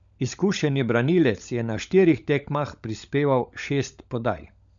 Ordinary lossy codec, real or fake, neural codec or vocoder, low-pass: none; fake; codec, 16 kHz, 16 kbps, FunCodec, trained on LibriTTS, 50 frames a second; 7.2 kHz